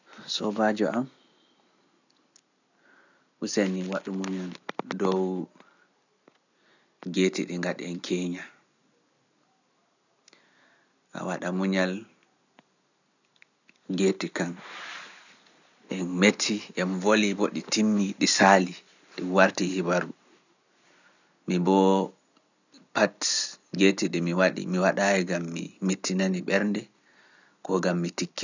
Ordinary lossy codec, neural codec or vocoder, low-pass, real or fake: none; none; 7.2 kHz; real